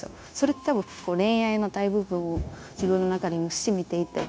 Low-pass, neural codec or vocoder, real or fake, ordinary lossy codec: none; codec, 16 kHz, 0.9 kbps, LongCat-Audio-Codec; fake; none